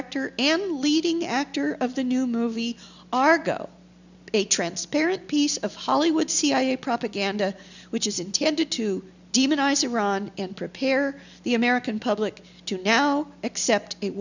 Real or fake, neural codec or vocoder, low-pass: real; none; 7.2 kHz